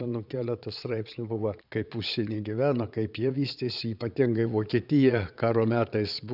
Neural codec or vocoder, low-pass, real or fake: vocoder, 22.05 kHz, 80 mel bands, Vocos; 5.4 kHz; fake